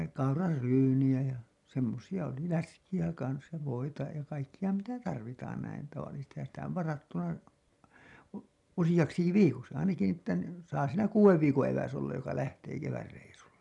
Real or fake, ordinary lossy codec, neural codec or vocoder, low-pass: real; none; none; 10.8 kHz